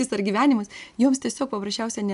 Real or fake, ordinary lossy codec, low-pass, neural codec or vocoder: real; AAC, 96 kbps; 10.8 kHz; none